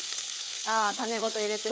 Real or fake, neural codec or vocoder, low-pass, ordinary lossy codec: fake; codec, 16 kHz, 4 kbps, FreqCodec, larger model; none; none